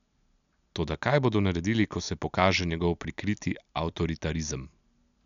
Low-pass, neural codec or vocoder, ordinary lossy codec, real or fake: 7.2 kHz; none; none; real